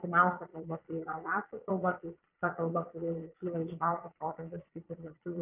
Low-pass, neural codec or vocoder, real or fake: 3.6 kHz; vocoder, 22.05 kHz, 80 mel bands, Vocos; fake